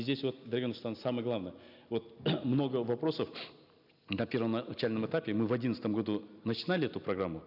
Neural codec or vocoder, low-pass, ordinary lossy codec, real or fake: none; 5.4 kHz; none; real